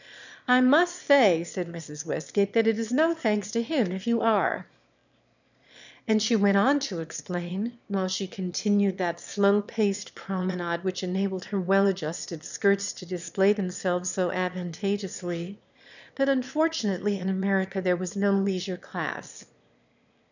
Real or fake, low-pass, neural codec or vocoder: fake; 7.2 kHz; autoencoder, 22.05 kHz, a latent of 192 numbers a frame, VITS, trained on one speaker